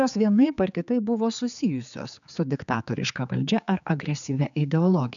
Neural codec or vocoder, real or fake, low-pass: codec, 16 kHz, 4 kbps, X-Codec, HuBERT features, trained on general audio; fake; 7.2 kHz